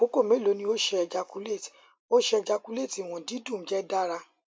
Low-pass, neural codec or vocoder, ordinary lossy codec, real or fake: none; none; none; real